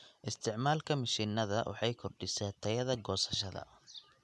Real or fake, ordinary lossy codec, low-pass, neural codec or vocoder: real; none; none; none